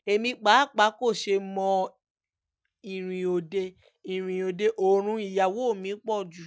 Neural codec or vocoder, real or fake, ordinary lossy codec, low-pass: none; real; none; none